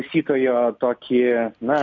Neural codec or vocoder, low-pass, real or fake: none; 7.2 kHz; real